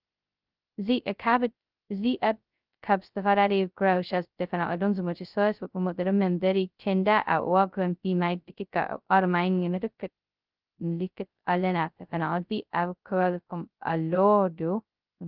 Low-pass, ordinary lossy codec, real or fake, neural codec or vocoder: 5.4 kHz; Opus, 24 kbps; fake; codec, 16 kHz, 0.2 kbps, FocalCodec